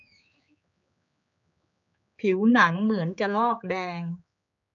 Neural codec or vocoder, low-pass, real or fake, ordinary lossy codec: codec, 16 kHz, 4 kbps, X-Codec, HuBERT features, trained on general audio; 7.2 kHz; fake; none